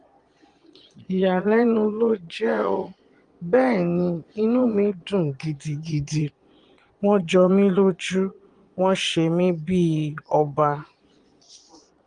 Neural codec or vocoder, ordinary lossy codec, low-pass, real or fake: vocoder, 22.05 kHz, 80 mel bands, Vocos; Opus, 24 kbps; 9.9 kHz; fake